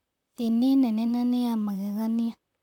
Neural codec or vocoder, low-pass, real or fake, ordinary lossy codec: vocoder, 44.1 kHz, 128 mel bands, Pupu-Vocoder; 19.8 kHz; fake; none